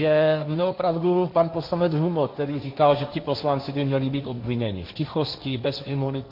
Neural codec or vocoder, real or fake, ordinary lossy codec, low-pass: codec, 16 kHz, 1.1 kbps, Voila-Tokenizer; fake; MP3, 48 kbps; 5.4 kHz